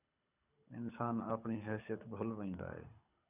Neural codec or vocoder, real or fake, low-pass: codec, 24 kHz, 6 kbps, HILCodec; fake; 3.6 kHz